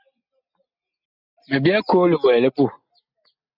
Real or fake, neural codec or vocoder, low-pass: real; none; 5.4 kHz